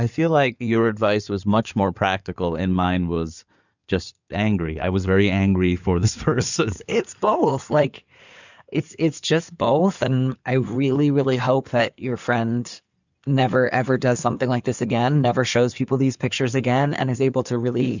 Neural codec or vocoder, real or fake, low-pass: codec, 16 kHz in and 24 kHz out, 2.2 kbps, FireRedTTS-2 codec; fake; 7.2 kHz